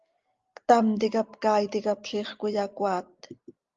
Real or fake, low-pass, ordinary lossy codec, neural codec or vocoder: real; 7.2 kHz; Opus, 32 kbps; none